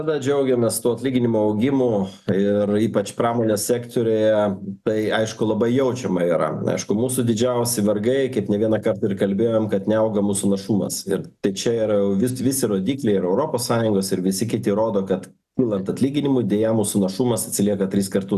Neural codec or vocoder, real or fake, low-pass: none; real; 14.4 kHz